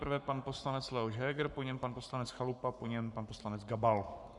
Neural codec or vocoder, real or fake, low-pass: codec, 44.1 kHz, 7.8 kbps, Pupu-Codec; fake; 10.8 kHz